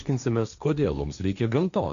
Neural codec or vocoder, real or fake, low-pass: codec, 16 kHz, 1.1 kbps, Voila-Tokenizer; fake; 7.2 kHz